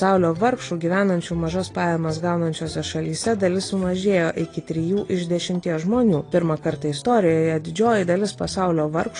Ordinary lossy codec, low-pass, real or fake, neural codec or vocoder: AAC, 32 kbps; 9.9 kHz; real; none